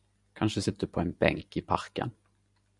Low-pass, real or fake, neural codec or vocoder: 10.8 kHz; real; none